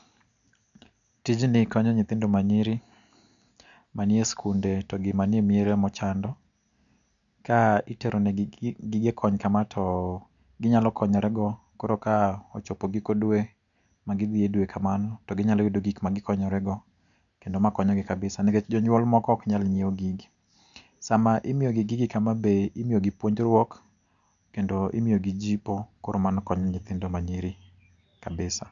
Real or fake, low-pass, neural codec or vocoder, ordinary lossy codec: real; 7.2 kHz; none; none